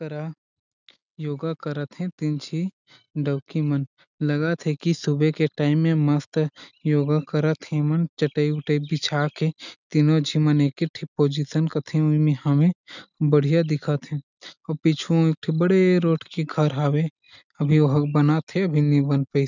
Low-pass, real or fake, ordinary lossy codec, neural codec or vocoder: 7.2 kHz; real; none; none